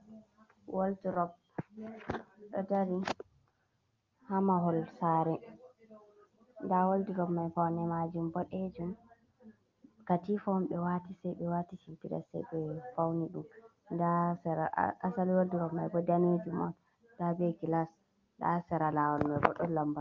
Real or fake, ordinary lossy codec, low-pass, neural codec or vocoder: real; Opus, 32 kbps; 7.2 kHz; none